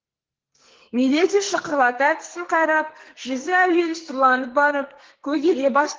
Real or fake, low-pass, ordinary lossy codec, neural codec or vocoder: fake; 7.2 kHz; Opus, 16 kbps; codec, 44.1 kHz, 2.6 kbps, SNAC